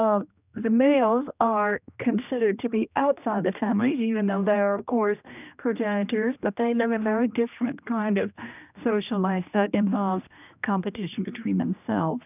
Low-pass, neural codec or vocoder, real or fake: 3.6 kHz; codec, 16 kHz, 1 kbps, X-Codec, HuBERT features, trained on general audio; fake